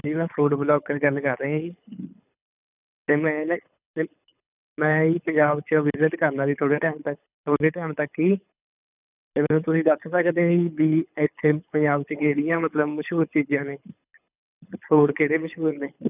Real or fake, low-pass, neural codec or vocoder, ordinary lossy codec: fake; 3.6 kHz; codec, 24 kHz, 6 kbps, HILCodec; none